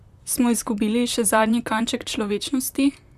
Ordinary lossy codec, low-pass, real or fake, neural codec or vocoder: none; 14.4 kHz; fake; vocoder, 44.1 kHz, 128 mel bands, Pupu-Vocoder